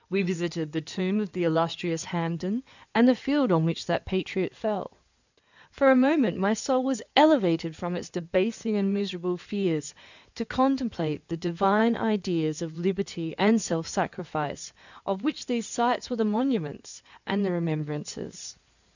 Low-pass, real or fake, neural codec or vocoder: 7.2 kHz; fake; codec, 16 kHz in and 24 kHz out, 2.2 kbps, FireRedTTS-2 codec